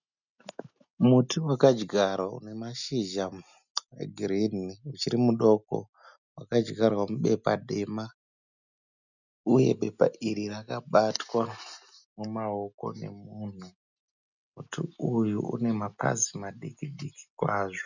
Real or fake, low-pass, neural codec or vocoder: real; 7.2 kHz; none